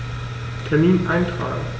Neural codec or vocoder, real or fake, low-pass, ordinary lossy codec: none; real; none; none